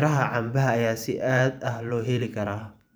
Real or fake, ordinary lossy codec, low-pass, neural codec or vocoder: fake; none; none; vocoder, 44.1 kHz, 128 mel bands every 512 samples, BigVGAN v2